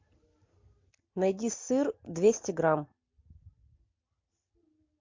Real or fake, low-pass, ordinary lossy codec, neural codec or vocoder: real; 7.2 kHz; MP3, 48 kbps; none